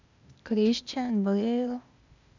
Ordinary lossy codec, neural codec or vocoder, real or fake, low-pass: none; codec, 16 kHz, 0.8 kbps, ZipCodec; fake; 7.2 kHz